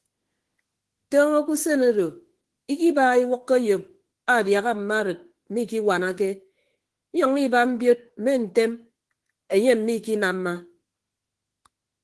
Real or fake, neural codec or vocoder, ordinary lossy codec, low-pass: fake; autoencoder, 48 kHz, 32 numbers a frame, DAC-VAE, trained on Japanese speech; Opus, 16 kbps; 10.8 kHz